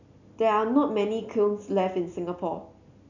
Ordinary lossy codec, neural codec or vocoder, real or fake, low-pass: none; none; real; 7.2 kHz